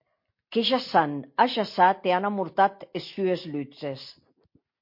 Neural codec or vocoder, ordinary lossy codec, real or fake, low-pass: none; MP3, 32 kbps; real; 5.4 kHz